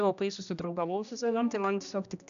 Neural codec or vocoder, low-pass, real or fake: codec, 16 kHz, 1 kbps, X-Codec, HuBERT features, trained on general audio; 7.2 kHz; fake